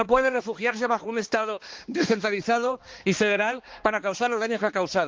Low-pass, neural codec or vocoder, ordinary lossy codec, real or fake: 7.2 kHz; codec, 16 kHz, 2 kbps, X-Codec, HuBERT features, trained on balanced general audio; Opus, 16 kbps; fake